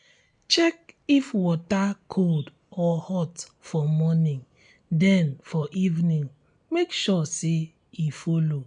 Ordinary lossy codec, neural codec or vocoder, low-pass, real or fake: none; none; 9.9 kHz; real